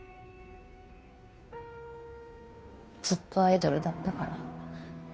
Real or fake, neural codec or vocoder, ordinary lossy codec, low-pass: fake; codec, 16 kHz, 2 kbps, FunCodec, trained on Chinese and English, 25 frames a second; none; none